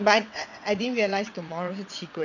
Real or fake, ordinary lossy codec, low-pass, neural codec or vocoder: fake; none; 7.2 kHz; vocoder, 22.05 kHz, 80 mel bands, WaveNeXt